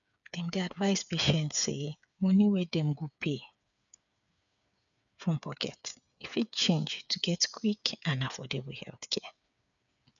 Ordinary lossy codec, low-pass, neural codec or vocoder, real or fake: none; 7.2 kHz; codec, 16 kHz, 8 kbps, FreqCodec, smaller model; fake